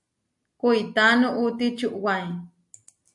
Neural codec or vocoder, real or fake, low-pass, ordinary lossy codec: none; real; 10.8 kHz; MP3, 48 kbps